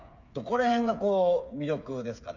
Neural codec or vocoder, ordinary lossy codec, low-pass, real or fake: codec, 16 kHz, 8 kbps, FreqCodec, smaller model; none; 7.2 kHz; fake